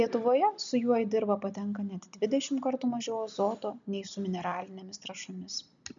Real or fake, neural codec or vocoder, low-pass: real; none; 7.2 kHz